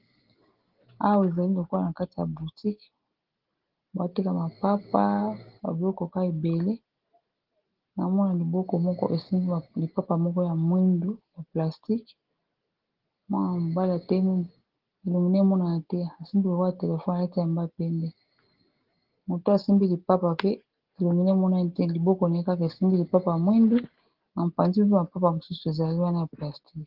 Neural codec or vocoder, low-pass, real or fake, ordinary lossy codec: none; 5.4 kHz; real; Opus, 16 kbps